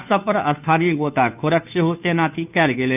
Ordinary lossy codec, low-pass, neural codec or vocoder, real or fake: none; 3.6 kHz; codec, 16 kHz, 2 kbps, FunCodec, trained on Chinese and English, 25 frames a second; fake